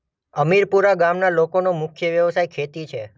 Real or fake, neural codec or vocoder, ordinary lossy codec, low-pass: real; none; none; none